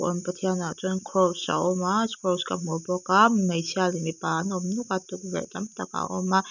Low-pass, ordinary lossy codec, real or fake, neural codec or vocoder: 7.2 kHz; none; real; none